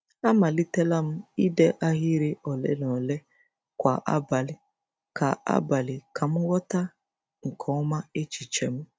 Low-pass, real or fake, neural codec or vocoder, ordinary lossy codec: none; real; none; none